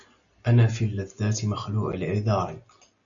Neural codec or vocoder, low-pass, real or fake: none; 7.2 kHz; real